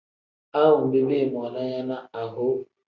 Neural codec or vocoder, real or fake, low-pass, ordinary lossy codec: none; real; 7.2 kHz; Opus, 64 kbps